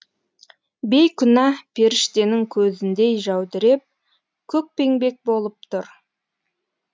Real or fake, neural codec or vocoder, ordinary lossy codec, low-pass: real; none; none; none